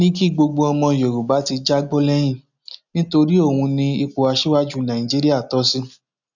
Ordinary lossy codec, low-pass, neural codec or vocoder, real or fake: none; 7.2 kHz; none; real